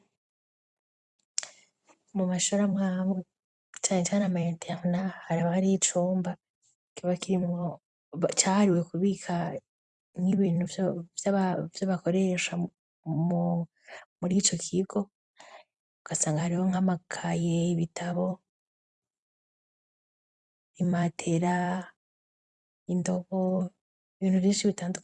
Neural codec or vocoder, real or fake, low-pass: vocoder, 44.1 kHz, 128 mel bands every 256 samples, BigVGAN v2; fake; 10.8 kHz